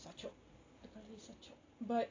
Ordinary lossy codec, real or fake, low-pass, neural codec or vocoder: AAC, 32 kbps; real; 7.2 kHz; none